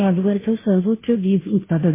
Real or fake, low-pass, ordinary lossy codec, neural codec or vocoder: fake; 3.6 kHz; MP3, 16 kbps; codec, 16 kHz, 0.5 kbps, FunCodec, trained on Chinese and English, 25 frames a second